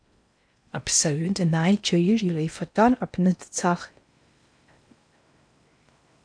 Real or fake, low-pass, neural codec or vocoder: fake; 9.9 kHz; codec, 16 kHz in and 24 kHz out, 0.6 kbps, FocalCodec, streaming, 4096 codes